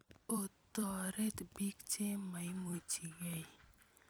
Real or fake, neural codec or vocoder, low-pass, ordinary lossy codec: fake; vocoder, 44.1 kHz, 128 mel bands every 256 samples, BigVGAN v2; none; none